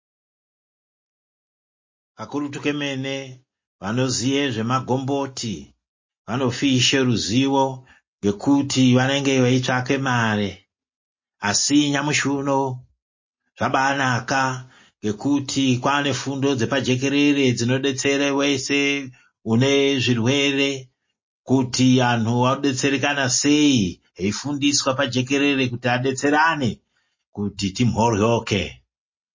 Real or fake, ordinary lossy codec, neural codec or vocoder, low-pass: real; MP3, 32 kbps; none; 7.2 kHz